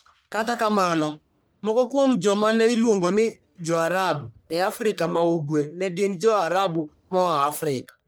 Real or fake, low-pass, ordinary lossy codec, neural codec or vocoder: fake; none; none; codec, 44.1 kHz, 1.7 kbps, Pupu-Codec